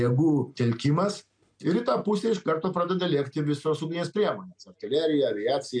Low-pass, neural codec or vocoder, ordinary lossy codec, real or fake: 9.9 kHz; none; MP3, 64 kbps; real